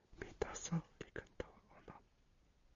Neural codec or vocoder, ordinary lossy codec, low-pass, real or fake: none; MP3, 64 kbps; 7.2 kHz; real